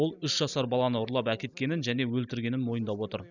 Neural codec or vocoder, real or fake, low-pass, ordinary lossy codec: none; real; 7.2 kHz; none